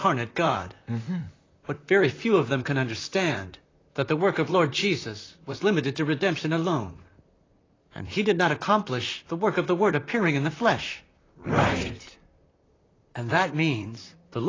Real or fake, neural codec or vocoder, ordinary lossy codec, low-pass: fake; vocoder, 44.1 kHz, 128 mel bands, Pupu-Vocoder; AAC, 32 kbps; 7.2 kHz